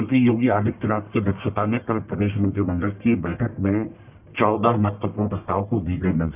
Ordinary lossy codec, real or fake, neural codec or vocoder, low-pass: none; fake; codec, 44.1 kHz, 1.7 kbps, Pupu-Codec; 3.6 kHz